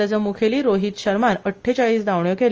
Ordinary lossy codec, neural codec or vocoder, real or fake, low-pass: Opus, 24 kbps; none; real; 7.2 kHz